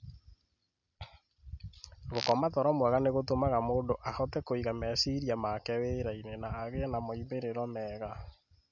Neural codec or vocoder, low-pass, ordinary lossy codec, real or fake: none; 7.2 kHz; none; real